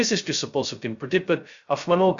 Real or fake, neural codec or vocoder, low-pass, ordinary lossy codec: fake; codec, 16 kHz, 0.2 kbps, FocalCodec; 7.2 kHz; Opus, 64 kbps